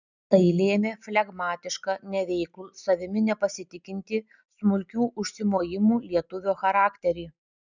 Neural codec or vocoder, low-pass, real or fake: none; 7.2 kHz; real